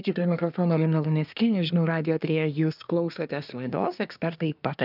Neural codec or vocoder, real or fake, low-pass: codec, 24 kHz, 1 kbps, SNAC; fake; 5.4 kHz